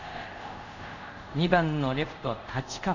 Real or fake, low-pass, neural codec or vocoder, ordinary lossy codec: fake; 7.2 kHz; codec, 24 kHz, 0.5 kbps, DualCodec; none